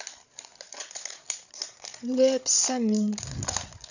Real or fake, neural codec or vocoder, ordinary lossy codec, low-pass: fake; codec, 16 kHz, 8 kbps, FreqCodec, larger model; none; 7.2 kHz